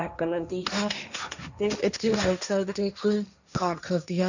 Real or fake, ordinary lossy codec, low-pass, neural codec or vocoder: fake; none; 7.2 kHz; codec, 16 kHz, 1.1 kbps, Voila-Tokenizer